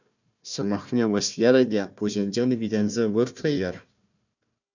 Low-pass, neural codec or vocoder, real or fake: 7.2 kHz; codec, 16 kHz, 1 kbps, FunCodec, trained on Chinese and English, 50 frames a second; fake